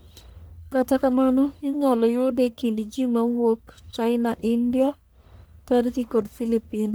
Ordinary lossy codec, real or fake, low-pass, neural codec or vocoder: none; fake; none; codec, 44.1 kHz, 1.7 kbps, Pupu-Codec